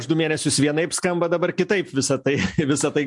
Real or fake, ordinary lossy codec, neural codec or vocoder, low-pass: real; MP3, 64 kbps; none; 10.8 kHz